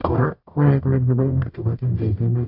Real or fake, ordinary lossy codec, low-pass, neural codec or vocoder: fake; AAC, 48 kbps; 5.4 kHz; codec, 44.1 kHz, 0.9 kbps, DAC